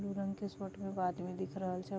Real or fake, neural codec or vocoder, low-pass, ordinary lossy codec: real; none; none; none